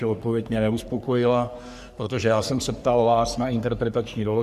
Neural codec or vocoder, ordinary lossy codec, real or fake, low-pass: codec, 44.1 kHz, 3.4 kbps, Pupu-Codec; Opus, 64 kbps; fake; 14.4 kHz